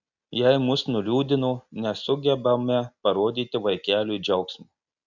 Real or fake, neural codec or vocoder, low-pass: real; none; 7.2 kHz